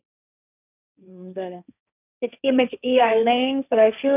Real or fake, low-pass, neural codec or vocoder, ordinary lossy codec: fake; 3.6 kHz; codec, 16 kHz, 1.1 kbps, Voila-Tokenizer; none